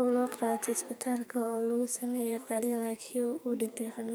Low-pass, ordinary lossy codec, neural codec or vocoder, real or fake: none; none; codec, 44.1 kHz, 2.6 kbps, SNAC; fake